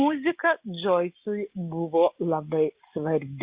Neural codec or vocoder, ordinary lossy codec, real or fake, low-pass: none; Opus, 64 kbps; real; 3.6 kHz